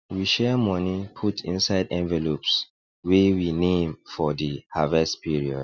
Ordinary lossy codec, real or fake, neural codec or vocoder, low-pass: none; real; none; 7.2 kHz